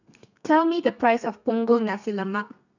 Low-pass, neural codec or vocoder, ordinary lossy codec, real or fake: 7.2 kHz; codec, 32 kHz, 1.9 kbps, SNAC; none; fake